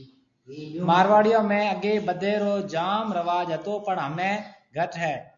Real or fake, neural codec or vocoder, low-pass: real; none; 7.2 kHz